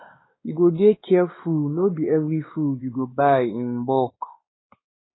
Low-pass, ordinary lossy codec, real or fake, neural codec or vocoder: 7.2 kHz; AAC, 16 kbps; fake; codec, 16 kHz, 2 kbps, X-Codec, WavLM features, trained on Multilingual LibriSpeech